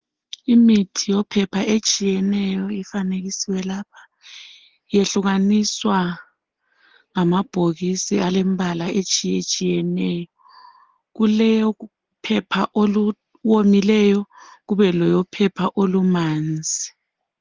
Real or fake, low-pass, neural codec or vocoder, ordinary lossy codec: real; 7.2 kHz; none; Opus, 16 kbps